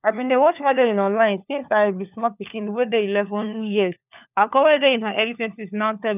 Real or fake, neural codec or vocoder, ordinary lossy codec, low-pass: fake; codec, 16 kHz, 4 kbps, FunCodec, trained on LibriTTS, 50 frames a second; none; 3.6 kHz